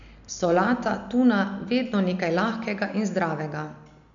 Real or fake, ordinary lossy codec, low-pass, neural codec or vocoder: real; none; 7.2 kHz; none